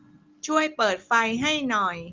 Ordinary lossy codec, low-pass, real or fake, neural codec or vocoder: Opus, 24 kbps; 7.2 kHz; real; none